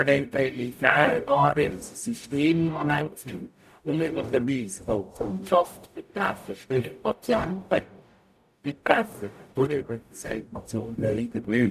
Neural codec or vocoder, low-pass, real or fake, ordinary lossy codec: codec, 44.1 kHz, 0.9 kbps, DAC; 14.4 kHz; fake; none